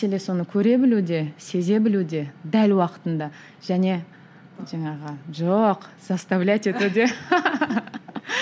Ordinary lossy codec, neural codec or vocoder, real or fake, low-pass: none; none; real; none